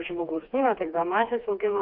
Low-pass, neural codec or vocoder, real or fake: 5.4 kHz; codec, 16 kHz, 2 kbps, FreqCodec, smaller model; fake